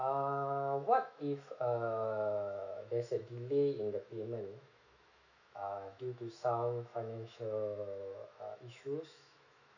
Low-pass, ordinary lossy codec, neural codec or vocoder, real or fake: 7.2 kHz; none; autoencoder, 48 kHz, 128 numbers a frame, DAC-VAE, trained on Japanese speech; fake